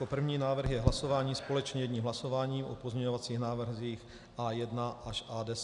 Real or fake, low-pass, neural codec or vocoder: real; 10.8 kHz; none